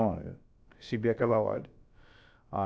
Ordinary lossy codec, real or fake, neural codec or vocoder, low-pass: none; fake; codec, 16 kHz, about 1 kbps, DyCAST, with the encoder's durations; none